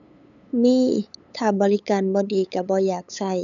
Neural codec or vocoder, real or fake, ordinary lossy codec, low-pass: codec, 16 kHz, 8 kbps, FunCodec, trained on LibriTTS, 25 frames a second; fake; none; 7.2 kHz